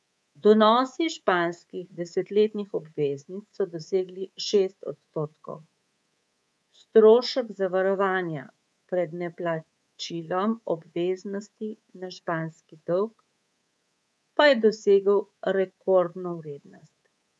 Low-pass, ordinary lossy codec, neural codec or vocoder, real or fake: none; none; codec, 24 kHz, 3.1 kbps, DualCodec; fake